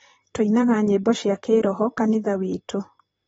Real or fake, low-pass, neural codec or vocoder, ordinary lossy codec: fake; 19.8 kHz; vocoder, 44.1 kHz, 128 mel bands, Pupu-Vocoder; AAC, 24 kbps